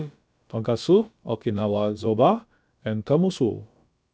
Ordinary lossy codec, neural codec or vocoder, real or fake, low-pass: none; codec, 16 kHz, about 1 kbps, DyCAST, with the encoder's durations; fake; none